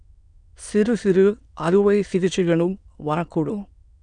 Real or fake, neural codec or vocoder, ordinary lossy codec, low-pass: fake; autoencoder, 22.05 kHz, a latent of 192 numbers a frame, VITS, trained on many speakers; none; 9.9 kHz